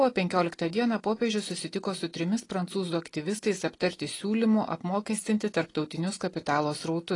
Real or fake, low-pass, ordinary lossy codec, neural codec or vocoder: real; 10.8 kHz; AAC, 32 kbps; none